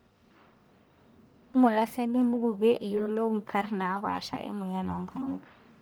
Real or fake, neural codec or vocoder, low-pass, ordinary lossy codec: fake; codec, 44.1 kHz, 1.7 kbps, Pupu-Codec; none; none